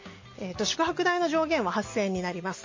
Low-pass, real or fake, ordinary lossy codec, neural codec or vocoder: 7.2 kHz; real; MP3, 32 kbps; none